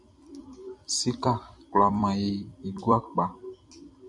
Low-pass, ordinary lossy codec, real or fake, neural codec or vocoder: 10.8 kHz; MP3, 96 kbps; fake; vocoder, 44.1 kHz, 128 mel bands every 256 samples, BigVGAN v2